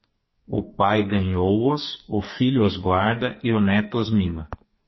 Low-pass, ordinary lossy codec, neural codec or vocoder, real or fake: 7.2 kHz; MP3, 24 kbps; codec, 44.1 kHz, 2.6 kbps, SNAC; fake